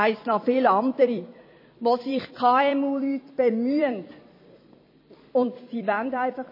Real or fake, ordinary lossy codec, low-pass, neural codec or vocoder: fake; MP3, 24 kbps; 5.4 kHz; vocoder, 44.1 kHz, 128 mel bands every 256 samples, BigVGAN v2